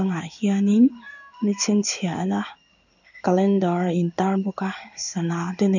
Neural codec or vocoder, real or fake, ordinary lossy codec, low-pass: codec, 16 kHz in and 24 kHz out, 1 kbps, XY-Tokenizer; fake; none; 7.2 kHz